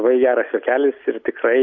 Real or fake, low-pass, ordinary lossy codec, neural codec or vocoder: real; 7.2 kHz; MP3, 48 kbps; none